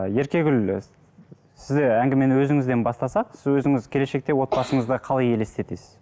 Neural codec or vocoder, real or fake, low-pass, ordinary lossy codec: none; real; none; none